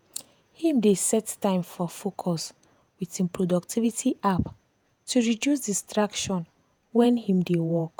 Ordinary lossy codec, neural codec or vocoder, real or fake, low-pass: none; vocoder, 48 kHz, 128 mel bands, Vocos; fake; none